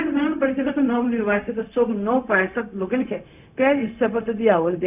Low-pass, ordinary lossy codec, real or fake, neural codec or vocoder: 3.6 kHz; none; fake; codec, 16 kHz, 0.4 kbps, LongCat-Audio-Codec